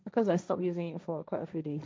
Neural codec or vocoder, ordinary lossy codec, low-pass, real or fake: codec, 16 kHz, 1.1 kbps, Voila-Tokenizer; none; none; fake